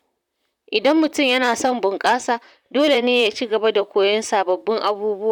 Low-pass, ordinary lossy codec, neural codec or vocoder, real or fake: 19.8 kHz; none; vocoder, 44.1 kHz, 128 mel bands, Pupu-Vocoder; fake